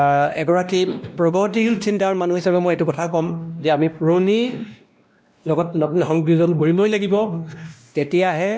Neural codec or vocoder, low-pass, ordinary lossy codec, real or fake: codec, 16 kHz, 1 kbps, X-Codec, WavLM features, trained on Multilingual LibriSpeech; none; none; fake